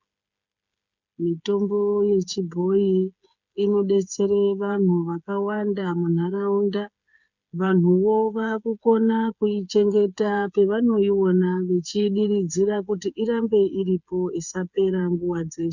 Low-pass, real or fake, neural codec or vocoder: 7.2 kHz; fake; codec, 16 kHz, 8 kbps, FreqCodec, smaller model